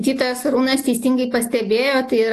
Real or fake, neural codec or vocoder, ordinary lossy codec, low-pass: real; none; AAC, 64 kbps; 14.4 kHz